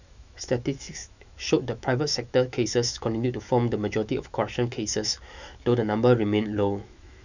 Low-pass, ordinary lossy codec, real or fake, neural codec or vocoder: 7.2 kHz; none; fake; autoencoder, 48 kHz, 128 numbers a frame, DAC-VAE, trained on Japanese speech